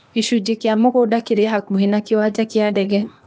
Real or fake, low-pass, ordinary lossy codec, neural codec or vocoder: fake; none; none; codec, 16 kHz, 0.8 kbps, ZipCodec